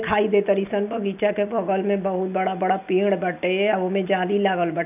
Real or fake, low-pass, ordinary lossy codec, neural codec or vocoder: real; 3.6 kHz; none; none